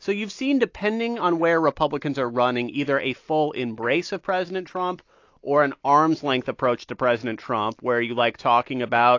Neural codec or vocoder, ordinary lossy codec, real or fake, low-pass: none; AAC, 48 kbps; real; 7.2 kHz